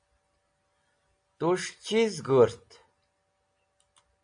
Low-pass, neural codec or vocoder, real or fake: 9.9 kHz; none; real